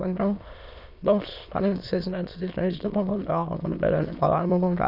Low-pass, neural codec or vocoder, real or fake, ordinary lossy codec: 5.4 kHz; autoencoder, 22.05 kHz, a latent of 192 numbers a frame, VITS, trained on many speakers; fake; none